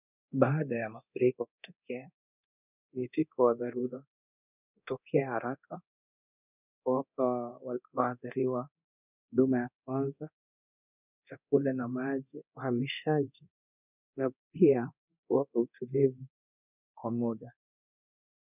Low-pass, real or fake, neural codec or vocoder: 3.6 kHz; fake; codec, 24 kHz, 0.9 kbps, DualCodec